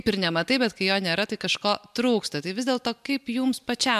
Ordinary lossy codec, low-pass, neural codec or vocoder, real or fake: MP3, 96 kbps; 14.4 kHz; none; real